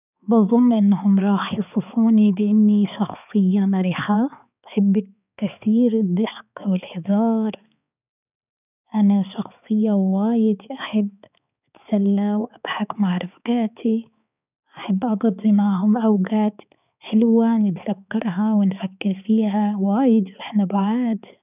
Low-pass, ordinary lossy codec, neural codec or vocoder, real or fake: 3.6 kHz; none; codec, 16 kHz, 4 kbps, X-Codec, HuBERT features, trained on balanced general audio; fake